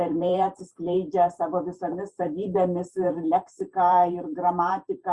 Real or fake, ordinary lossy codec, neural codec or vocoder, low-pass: fake; Opus, 64 kbps; vocoder, 44.1 kHz, 128 mel bands every 512 samples, BigVGAN v2; 10.8 kHz